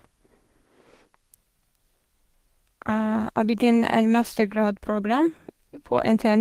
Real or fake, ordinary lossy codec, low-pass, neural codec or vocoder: fake; Opus, 24 kbps; 14.4 kHz; codec, 32 kHz, 1.9 kbps, SNAC